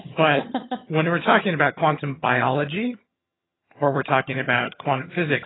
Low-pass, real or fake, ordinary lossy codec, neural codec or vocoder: 7.2 kHz; fake; AAC, 16 kbps; vocoder, 22.05 kHz, 80 mel bands, HiFi-GAN